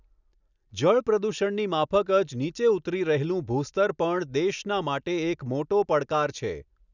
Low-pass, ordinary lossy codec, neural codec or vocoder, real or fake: 7.2 kHz; none; none; real